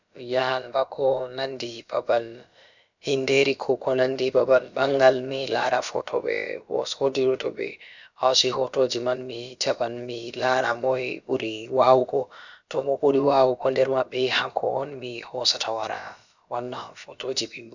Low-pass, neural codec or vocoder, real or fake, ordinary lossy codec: 7.2 kHz; codec, 16 kHz, about 1 kbps, DyCAST, with the encoder's durations; fake; none